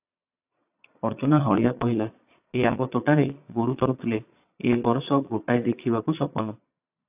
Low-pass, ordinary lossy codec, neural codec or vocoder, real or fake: 3.6 kHz; AAC, 32 kbps; vocoder, 22.05 kHz, 80 mel bands, Vocos; fake